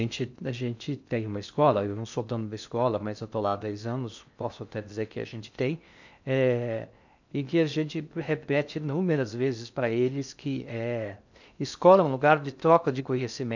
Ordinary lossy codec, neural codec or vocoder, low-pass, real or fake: none; codec, 16 kHz in and 24 kHz out, 0.6 kbps, FocalCodec, streaming, 2048 codes; 7.2 kHz; fake